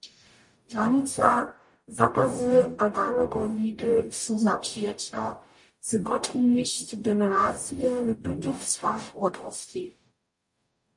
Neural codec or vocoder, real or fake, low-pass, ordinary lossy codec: codec, 44.1 kHz, 0.9 kbps, DAC; fake; 10.8 kHz; MP3, 48 kbps